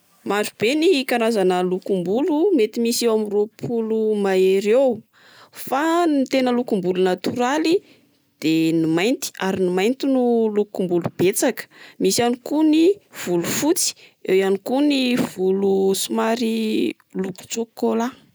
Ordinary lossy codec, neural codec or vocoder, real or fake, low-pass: none; none; real; none